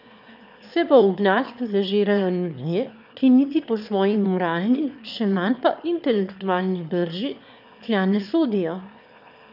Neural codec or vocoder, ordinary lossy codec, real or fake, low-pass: autoencoder, 22.05 kHz, a latent of 192 numbers a frame, VITS, trained on one speaker; none; fake; 5.4 kHz